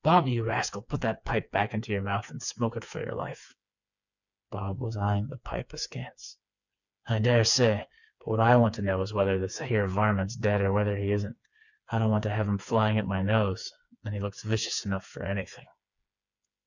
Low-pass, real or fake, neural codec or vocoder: 7.2 kHz; fake; codec, 16 kHz, 4 kbps, FreqCodec, smaller model